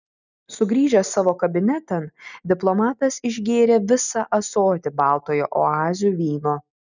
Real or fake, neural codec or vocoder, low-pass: real; none; 7.2 kHz